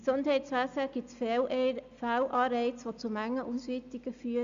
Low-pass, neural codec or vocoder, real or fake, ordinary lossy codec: 7.2 kHz; none; real; Opus, 64 kbps